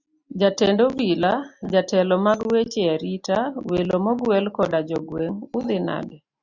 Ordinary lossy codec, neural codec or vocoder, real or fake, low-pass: Opus, 64 kbps; none; real; 7.2 kHz